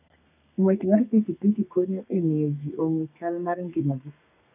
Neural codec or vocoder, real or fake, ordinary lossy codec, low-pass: codec, 32 kHz, 1.9 kbps, SNAC; fake; none; 3.6 kHz